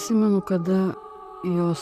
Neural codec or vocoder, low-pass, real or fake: vocoder, 44.1 kHz, 128 mel bands, Pupu-Vocoder; 14.4 kHz; fake